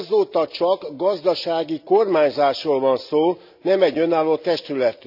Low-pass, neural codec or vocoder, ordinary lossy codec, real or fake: 5.4 kHz; none; none; real